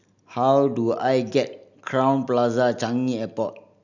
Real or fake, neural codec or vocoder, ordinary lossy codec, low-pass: real; none; none; 7.2 kHz